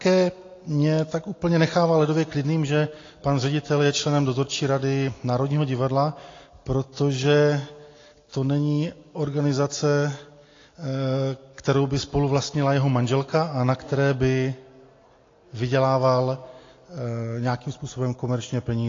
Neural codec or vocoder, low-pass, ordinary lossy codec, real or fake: none; 7.2 kHz; AAC, 32 kbps; real